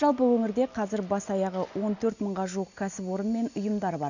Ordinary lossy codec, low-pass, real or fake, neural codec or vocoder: none; 7.2 kHz; real; none